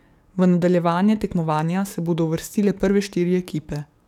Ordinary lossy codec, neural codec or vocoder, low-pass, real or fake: none; codec, 44.1 kHz, 7.8 kbps, DAC; 19.8 kHz; fake